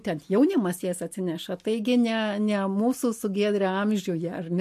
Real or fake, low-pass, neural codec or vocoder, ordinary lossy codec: real; 14.4 kHz; none; MP3, 64 kbps